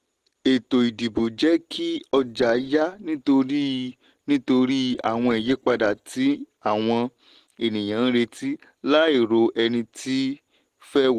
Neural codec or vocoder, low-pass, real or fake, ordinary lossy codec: none; 14.4 kHz; real; Opus, 16 kbps